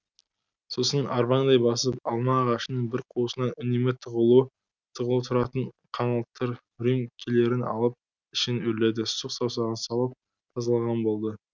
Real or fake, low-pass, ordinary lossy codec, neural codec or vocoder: real; 7.2 kHz; none; none